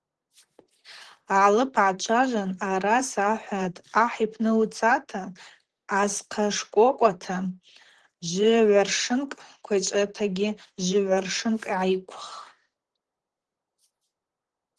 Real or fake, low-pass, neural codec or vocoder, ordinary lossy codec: fake; 10.8 kHz; vocoder, 44.1 kHz, 128 mel bands, Pupu-Vocoder; Opus, 16 kbps